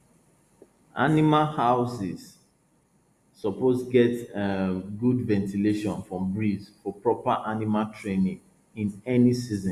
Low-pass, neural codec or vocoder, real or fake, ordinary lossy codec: 14.4 kHz; none; real; Opus, 64 kbps